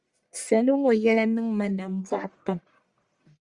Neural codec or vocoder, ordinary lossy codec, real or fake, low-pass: codec, 44.1 kHz, 1.7 kbps, Pupu-Codec; Opus, 64 kbps; fake; 10.8 kHz